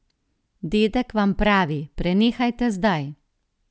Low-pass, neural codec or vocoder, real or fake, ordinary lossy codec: none; none; real; none